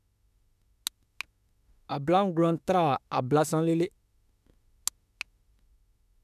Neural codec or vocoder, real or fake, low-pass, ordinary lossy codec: autoencoder, 48 kHz, 32 numbers a frame, DAC-VAE, trained on Japanese speech; fake; 14.4 kHz; none